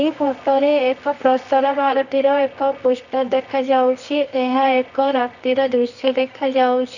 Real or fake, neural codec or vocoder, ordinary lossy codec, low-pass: fake; codec, 24 kHz, 0.9 kbps, WavTokenizer, medium music audio release; none; 7.2 kHz